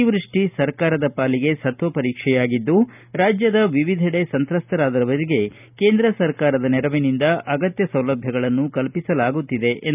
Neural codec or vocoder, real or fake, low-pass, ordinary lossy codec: none; real; 3.6 kHz; none